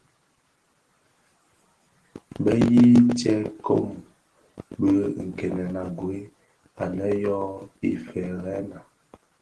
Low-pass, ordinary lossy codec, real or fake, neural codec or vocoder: 10.8 kHz; Opus, 16 kbps; real; none